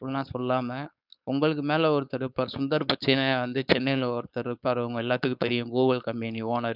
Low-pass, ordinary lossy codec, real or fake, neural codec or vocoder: 5.4 kHz; none; fake; codec, 16 kHz, 4.8 kbps, FACodec